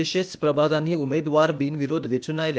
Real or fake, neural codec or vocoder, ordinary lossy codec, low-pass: fake; codec, 16 kHz, 0.8 kbps, ZipCodec; none; none